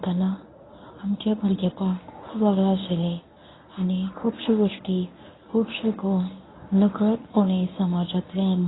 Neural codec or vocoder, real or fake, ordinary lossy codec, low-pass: codec, 24 kHz, 0.9 kbps, WavTokenizer, medium speech release version 1; fake; AAC, 16 kbps; 7.2 kHz